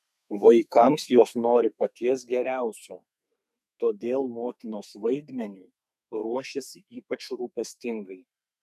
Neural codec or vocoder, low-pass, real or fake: codec, 32 kHz, 1.9 kbps, SNAC; 14.4 kHz; fake